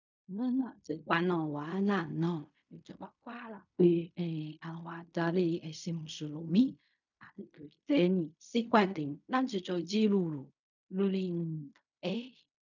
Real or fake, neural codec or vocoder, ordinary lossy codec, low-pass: fake; codec, 16 kHz in and 24 kHz out, 0.4 kbps, LongCat-Audio-Codec, fine tuned four codebook decoder; none; 7.2 kHz